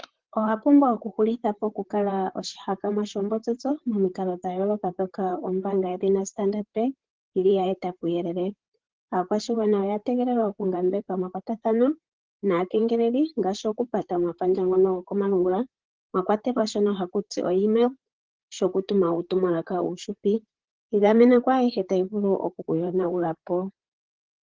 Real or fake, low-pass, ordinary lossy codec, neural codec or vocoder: fake; 7.2 kHz; Opus, 16 kbps; vocoder, 44.1 kHz, 128 mel bands, Pupu-Vocoder